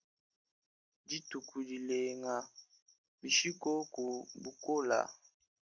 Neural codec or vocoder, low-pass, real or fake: none; 7.2 kHz; real